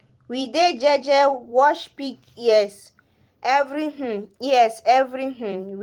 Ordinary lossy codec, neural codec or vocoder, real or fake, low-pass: Opus, 24 kbps; vocoder, 44.1 kHz, 128 mel bands every 256 samples, BigVGAN v2; fake; 19.8 kHz